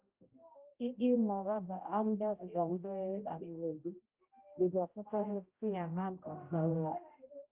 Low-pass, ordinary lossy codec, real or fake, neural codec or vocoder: 3.6 kHz; Opus, 24 kbps; fake; codec, 16 kHz, 0.5 kbps, X-Codec, HuBERT features, trained on general audio